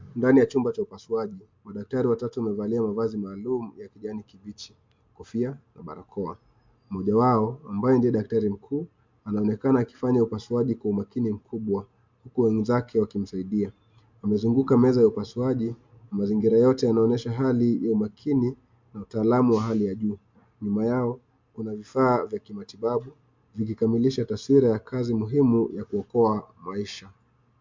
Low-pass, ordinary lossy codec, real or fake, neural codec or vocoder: 7.2 kHz; MP3, 64 kbps; real; none